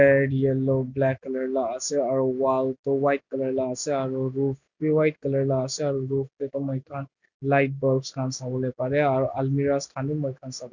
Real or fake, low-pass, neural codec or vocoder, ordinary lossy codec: real; 7.2 kHz; none; none